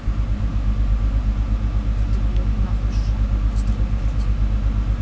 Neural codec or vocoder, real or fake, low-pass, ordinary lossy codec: none; real; none; none